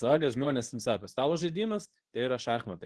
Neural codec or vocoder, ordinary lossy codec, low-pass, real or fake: codec, 24 kHz, 0.9 kbps, WavTokenizer, medium speech release version 2; Opus, 16 kbps; 10.8 kHz; fake